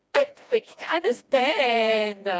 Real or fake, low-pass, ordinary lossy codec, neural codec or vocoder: fake; none; none; codec, 16 kHz, 1 kbps, FreqCodec, smaller model